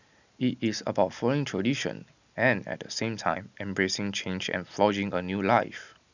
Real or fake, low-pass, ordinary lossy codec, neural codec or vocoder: real; 7.2 kHz; none; none